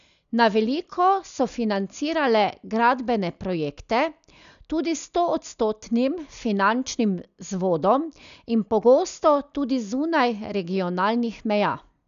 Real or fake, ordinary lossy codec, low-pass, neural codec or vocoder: real; none; 7.2 kHz; none